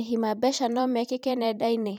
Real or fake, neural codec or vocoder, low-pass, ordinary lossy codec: fake; vocoder, 44.1 kHz, 128 mel bands every 512 samples, BigVGAN v2; 19.8 kHz; none